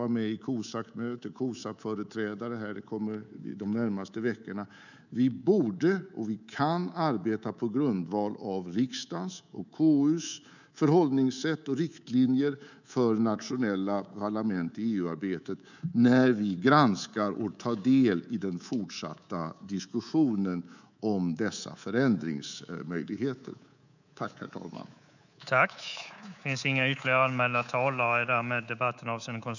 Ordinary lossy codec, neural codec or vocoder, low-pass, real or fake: none; codec, 24 kHz, 3.1 kbps, DualCodec; 7.2 kHz; fake